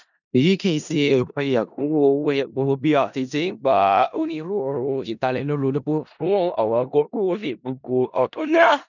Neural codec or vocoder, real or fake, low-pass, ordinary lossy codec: codec, 16 kHz in and 24 kHz out, 0.4 kbps, LongCat-Audio-Codec, four codebook decoder; fake; 7.2 kHz; none